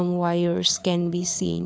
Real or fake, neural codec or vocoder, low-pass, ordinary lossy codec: fake; codec, 16 kHz, 4 kbps, FreqCodec, larger model; none; none